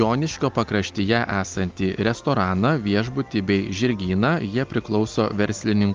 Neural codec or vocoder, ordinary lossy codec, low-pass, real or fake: none; Opus, 32 kbps; 7.2 kHz; real